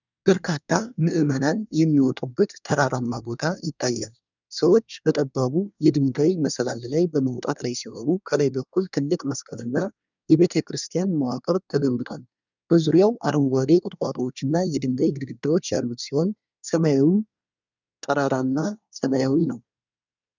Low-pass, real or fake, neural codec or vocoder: 7.2 kHz; fake; codec, 24 kHz, 1 kbps, SNAC